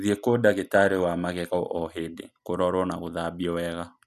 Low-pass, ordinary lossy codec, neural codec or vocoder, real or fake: 14.4 kHz; none; none; real